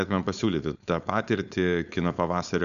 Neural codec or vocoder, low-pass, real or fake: codec, 16 kHz, 4.8 kbps, FACodec; 7.2 kHz; fake